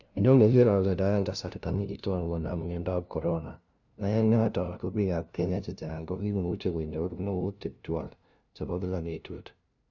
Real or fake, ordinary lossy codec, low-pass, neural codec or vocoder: fake; none; 7.2 kHz; codec, 16 kHz, 0.5 kbps, FunCodec, trained on LibriTTS, 25 frames a second